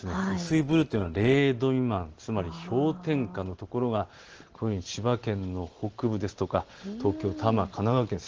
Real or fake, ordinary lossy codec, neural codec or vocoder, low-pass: real; Opus, 16 kbps; none; 7.2 kHz